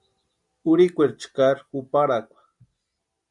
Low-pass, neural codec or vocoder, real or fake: 10.8 kHz; none; real